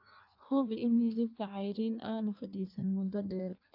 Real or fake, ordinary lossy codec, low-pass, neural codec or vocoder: fake; AAC, 48 kbps; 5.4 kHz; codec, 16 kHz in and 24 kHz out, 1.1 kbps, FireRedTTS-2 codec